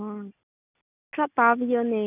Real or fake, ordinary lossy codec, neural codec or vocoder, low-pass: real; none; none; 3.6 kHz